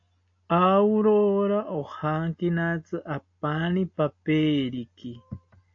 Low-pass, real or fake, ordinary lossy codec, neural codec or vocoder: 7.2 kHz; real; AAC, 48 kbps; none